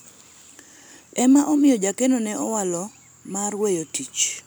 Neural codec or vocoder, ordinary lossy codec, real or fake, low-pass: none; none; real; none